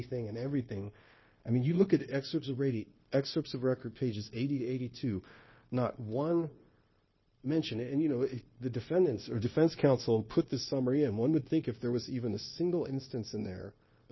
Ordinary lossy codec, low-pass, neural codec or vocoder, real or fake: MP3, 24 kbps; 7.2 kHz; codec, 16 kHz, 0.9 kbps, LongCat-Audio-Codec; fake